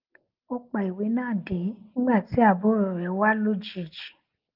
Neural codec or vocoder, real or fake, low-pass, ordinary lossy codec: none; real; 5.4 kHz; Opus, 24 kbps